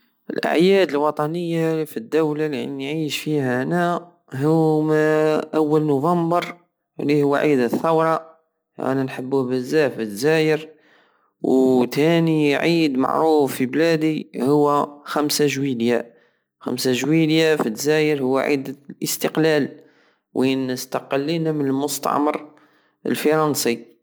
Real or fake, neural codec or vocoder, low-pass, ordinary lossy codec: real; none; none; none